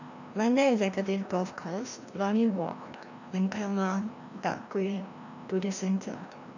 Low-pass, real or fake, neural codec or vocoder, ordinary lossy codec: 7.2 kHz; fake; codec, 16 kHz, 1 kbps, FreqCodec, larger model; none